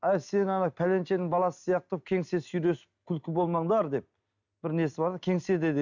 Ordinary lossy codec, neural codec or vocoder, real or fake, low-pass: none; none; real; 7.2 kHz